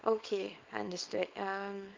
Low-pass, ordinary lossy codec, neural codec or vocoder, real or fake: 7.2 kHz; Opus, 32 kbps; codec, 24 kHz, 0.9 kbps, WavTokenizer, small release; fake